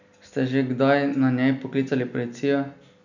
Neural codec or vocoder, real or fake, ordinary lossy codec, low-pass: none; real; none; 7.2 kHz